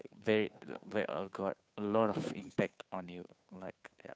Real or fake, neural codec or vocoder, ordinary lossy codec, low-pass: fake; codec, 16 kHz, 2 kbps, FunCodec, trained on Chinese and English, 25 frames a second; none; none